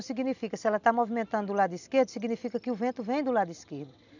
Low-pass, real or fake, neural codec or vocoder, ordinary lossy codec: 7.2 kHz; real; none; none